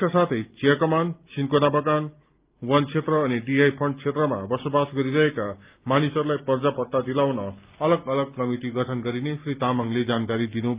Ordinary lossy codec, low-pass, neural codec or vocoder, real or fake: Opus, 32 kbps; 3.6 kHz; none; real